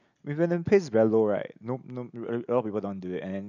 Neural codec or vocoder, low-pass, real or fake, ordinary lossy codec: none; 7.2 kHz; real; none